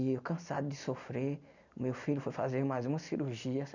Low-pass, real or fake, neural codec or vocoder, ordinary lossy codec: 7.2 kHz; real; none; none